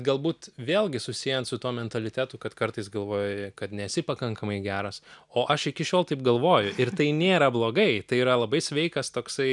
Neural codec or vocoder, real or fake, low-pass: none; real; 10.8 kHz